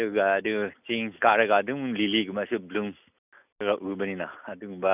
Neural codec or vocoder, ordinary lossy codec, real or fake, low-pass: none; none; real; 3.6 kHz